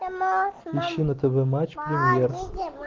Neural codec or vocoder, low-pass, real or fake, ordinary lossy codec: none; 7.2 kHz; real; Opus, 16 kbps